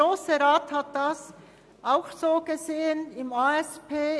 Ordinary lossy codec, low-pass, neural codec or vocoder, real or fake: none; none; none; real